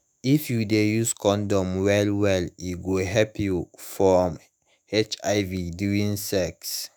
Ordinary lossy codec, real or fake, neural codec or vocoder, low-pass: none; fake; autoencoder, 48 kHz, 128 numbers a frame, DAC-VAE, trained on Japanese speech; none